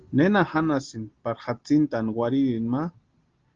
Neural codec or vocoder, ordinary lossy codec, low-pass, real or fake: none; Opus, 16 kbps; 7.2 kHz; real